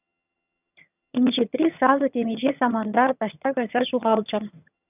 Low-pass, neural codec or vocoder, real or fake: 3.6 kHz; vocoder, 22.05 kHz, 80 mel bands, HiFi-GAN; fake